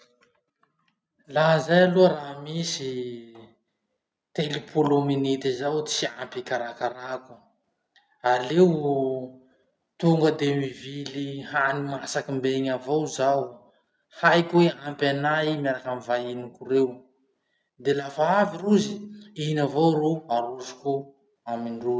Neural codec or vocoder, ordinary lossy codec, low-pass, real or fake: none; none; none; real